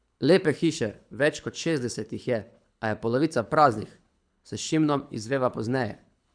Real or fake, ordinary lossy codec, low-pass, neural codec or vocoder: fake; none; 9.9 kHz; codec, 24 kHz, 6 kbps, HILCodec